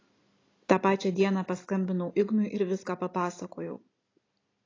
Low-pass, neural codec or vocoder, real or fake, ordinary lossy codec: 7.2 kHz; none; real; AAC, 32 kbps